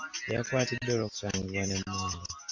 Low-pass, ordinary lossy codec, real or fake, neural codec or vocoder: 7.2 kHz; AAC, 48 kbps; real; none